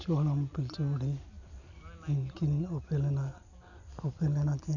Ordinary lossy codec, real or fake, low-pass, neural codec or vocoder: none; real; 7.2 kHz; none